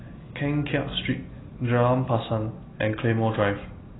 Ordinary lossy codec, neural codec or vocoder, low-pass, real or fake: AAC, 16 kbps; none; 7.2 kHz; real